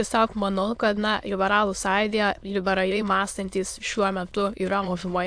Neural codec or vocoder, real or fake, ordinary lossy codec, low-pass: autoencoder, 22.05 kHz, a latent of 192 numbers a frame, VITS, trained on many speakers; fake; AAC, 64 kbps; 9.9 kHz